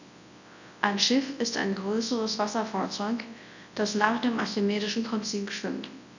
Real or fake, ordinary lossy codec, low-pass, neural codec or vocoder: fake; none; 7.2 kHz; codec, 24 kHz, 0.9 kbps, WavTokenizer, large speech release